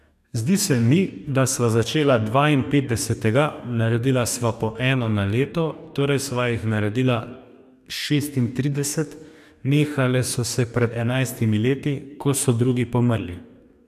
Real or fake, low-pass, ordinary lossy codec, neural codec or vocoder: fake; 14.4 kHz; none; codec, 44.1 kHz, 2.6 kbps, DAC